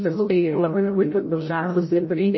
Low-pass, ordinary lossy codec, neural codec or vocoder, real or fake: 7.2 kHz; MP3, 24 kbps; codec, 16 kHz, 0.5 kbps, FreqCodec, larger model; fake